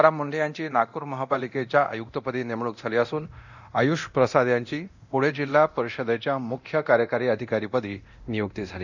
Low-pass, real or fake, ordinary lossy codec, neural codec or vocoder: 7.2 kHz; fake; none; codec, 24 kHz, 0.9 kbps, DualCodec